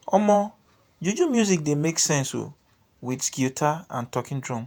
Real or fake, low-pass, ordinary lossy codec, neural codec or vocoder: fake; none; none; vocoder, 48 kHz, 128 mel bands, Vocos